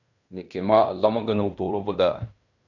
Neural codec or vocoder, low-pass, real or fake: codec, 16 kHz in and 24 kHz out, 0.9 kbps, LongCat-Audio-Codec, fine tuned four codebook decoder; 7.2 kHz; fake